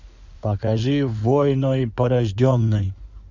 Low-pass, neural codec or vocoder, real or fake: 7.2 kHz; codec, 16 kHz in and 24 kHz out, 2.2 kbps, FireRedTTS-2 codec; fake